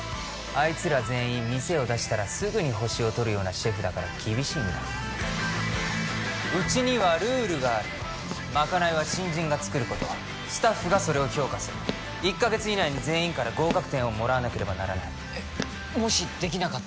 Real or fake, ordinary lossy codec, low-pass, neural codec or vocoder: real; none; none; none